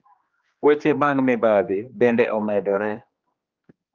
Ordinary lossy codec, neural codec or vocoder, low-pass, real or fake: Opus, 24 kbps; codec, 16 kHz, 2 kbps, X-Codec, HuBERT features, trained on general audio; 7.2 kHz; fake